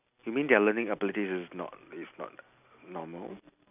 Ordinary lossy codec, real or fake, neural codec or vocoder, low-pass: none; real; none; 3.6 kHz